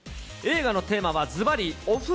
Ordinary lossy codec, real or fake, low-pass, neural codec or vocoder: none; real; none; none